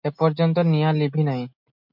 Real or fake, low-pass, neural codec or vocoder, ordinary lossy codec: real; 5.4 kHz; none; MP3, 48 kbps